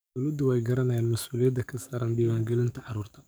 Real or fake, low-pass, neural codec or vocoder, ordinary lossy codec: fake; none; codec, 44.1 kHz, 7.8 kbps, DAC; none